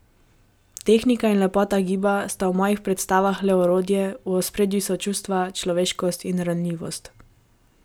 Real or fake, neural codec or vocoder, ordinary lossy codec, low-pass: real; none; none; none